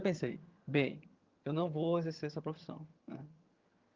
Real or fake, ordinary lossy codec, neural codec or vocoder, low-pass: fake; Opus, 32 kbps; vocoder, 22.05 kHz, 80 mel bands, HiFi-GAN; 7.2 kHz